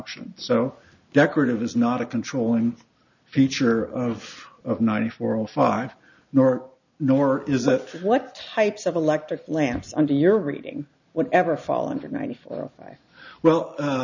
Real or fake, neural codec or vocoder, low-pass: real; none; 7.2 kHz